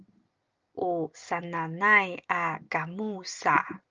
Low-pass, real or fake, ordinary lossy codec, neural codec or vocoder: 7.2 kHz; real; Opus, 24 kbps; none